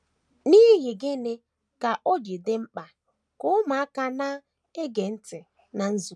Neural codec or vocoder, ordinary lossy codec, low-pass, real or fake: none; none; none; real